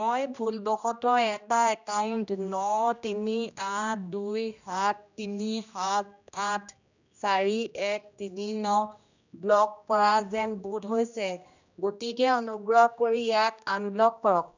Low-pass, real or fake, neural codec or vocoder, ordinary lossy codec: 7.2 kHz; fake; codec, 16 kHz, 1 kbps, X-Codec, HuBERT features, trained on general audio; none